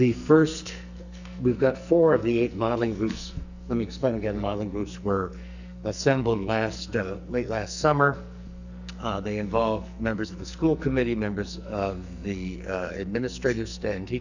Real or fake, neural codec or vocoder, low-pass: fake; codec, 44.1 kHz, 2.6 kbps, SNAC; 7.2 kHz